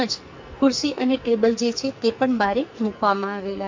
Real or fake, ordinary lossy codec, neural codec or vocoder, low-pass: fake; MP3, 48 kbps; codec, 44.1 kHz, 2.6 kbps, SNAC; 7.2 kHz